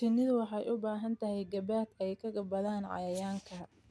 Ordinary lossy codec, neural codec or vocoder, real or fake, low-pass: none; none; real; none